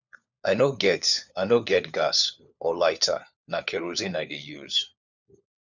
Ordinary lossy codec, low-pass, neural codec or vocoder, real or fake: none; 7.2 kHz; codec, 16 kHz, 4 kbps, FunCodec, trained on LibriTTS, 50 frames a second; fake